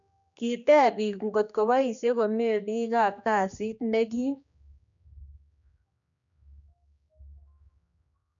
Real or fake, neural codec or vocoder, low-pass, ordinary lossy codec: fake; codec, 16 kHz, 2 kbps, X-Codec, HuBERT features, trained on general audio; 7.2 kHz; none